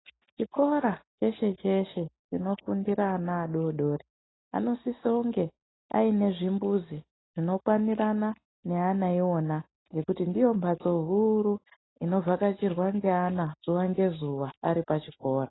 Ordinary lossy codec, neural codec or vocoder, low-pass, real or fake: AAC, 16 kbps; none; 7.2 kHz; real